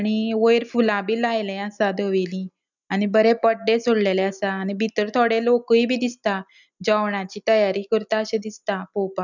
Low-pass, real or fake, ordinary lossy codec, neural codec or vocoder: 7.2 kHz; real; none; none